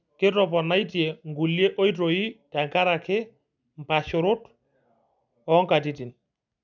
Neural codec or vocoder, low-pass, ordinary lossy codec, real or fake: none; 7.2 kHz; none; real